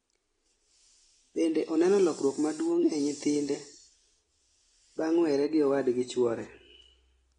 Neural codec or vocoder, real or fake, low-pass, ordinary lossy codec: none; real; 9.9 kHz; AAC, 32 kbps